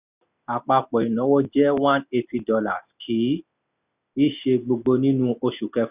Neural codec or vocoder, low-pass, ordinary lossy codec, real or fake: none; 3.6 kHz; none; real